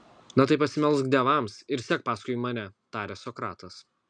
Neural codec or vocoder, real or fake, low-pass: none; real; 9.9 kHz